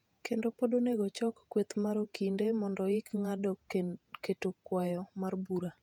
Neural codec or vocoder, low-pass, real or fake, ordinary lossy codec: vocoder, 48 kHz, 128 mel bands, Vocos; 19.8 kHz; fake; none